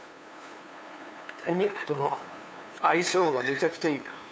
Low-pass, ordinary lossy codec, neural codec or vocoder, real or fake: none; none; codec, 16 kHz, 2 kbps, FunCodec, trained on LibriTTS, 25 frames a second; fake